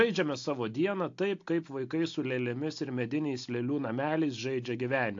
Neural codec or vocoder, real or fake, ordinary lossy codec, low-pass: none; real; AAC, 48 kbps; 7.2 kHz